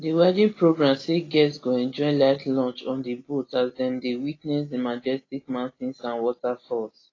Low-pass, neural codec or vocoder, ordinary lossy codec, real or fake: 7.2 kHz; none; AAC, 32 kbps; real